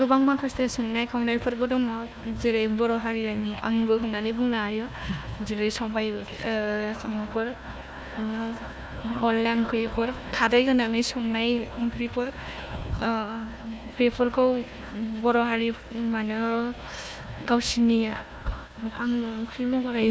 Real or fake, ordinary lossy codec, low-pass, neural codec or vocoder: fake; none; none; codec, 16 kHz, 1 kbps, FunCodec, trained on Chinese and English, 50 frames a second